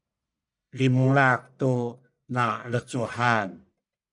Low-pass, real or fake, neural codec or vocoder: 10.8 kHz; fake; codec, 44.1 kHz, 1.7 kbps, Pupu-Codec